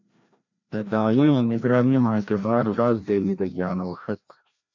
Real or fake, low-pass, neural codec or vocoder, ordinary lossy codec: fake; 7.2 kHz; codec, 16 kHz, 1 kbps, FreqCodec, larger model; MP3, 64 kbps